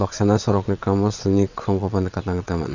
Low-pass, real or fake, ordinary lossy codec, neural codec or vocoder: 7.2 kHz; fake; none; vocoder, 22.05 kHz, 80 mel bands, WaveNeXt